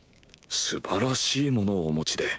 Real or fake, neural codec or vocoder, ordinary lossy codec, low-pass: fake; codec, 16 kHz, 6 kbps, DAC; none; none